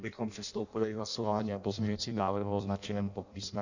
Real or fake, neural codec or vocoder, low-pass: fake; codec, 16 kHz in and 24 kHz out, 0.6 kbps, FireRedTTS-2 codec; 7.2 kHz